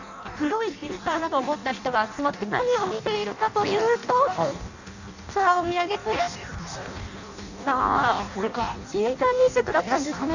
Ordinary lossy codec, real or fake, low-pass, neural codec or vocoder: none; fake; 7.2 kHz; codec, 16 kHz in and 24 kHz out, 0.6 kbps, FireRedTTS-2 codec